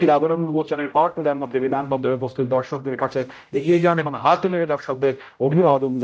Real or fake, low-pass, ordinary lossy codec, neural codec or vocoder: fake; none; none; codec, 16 kHz, 0.5 kbps, X-Codec, HuBERT features, trained on general audio